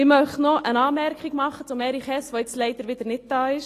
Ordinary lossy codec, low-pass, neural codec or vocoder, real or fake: AAC, 48 kbps; 14.4 kHz; none; real